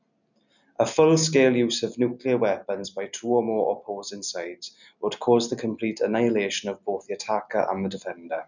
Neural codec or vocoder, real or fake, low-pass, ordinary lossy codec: none; real; 7.2 kHz; none